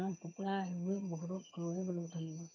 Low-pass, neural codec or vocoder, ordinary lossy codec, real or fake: 7.2 kHz; vocoder, 22.05 kHz, 80 mel bands, HiFi-GAN; none; fake